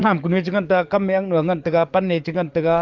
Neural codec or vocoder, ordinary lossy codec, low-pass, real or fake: codec, 44.1 kHz, 7.8 kbps, DAC; Opus, 24 kbps; 7.2 kHz; fake